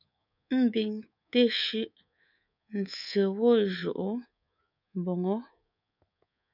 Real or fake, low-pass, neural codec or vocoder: fake; 5.4 kHz; codec, 24 kHz, 3.1 kbps, DualCodec